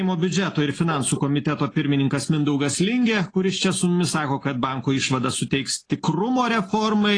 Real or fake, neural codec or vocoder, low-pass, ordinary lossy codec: real; none; 9.9 kHz; AAC, 32 kbps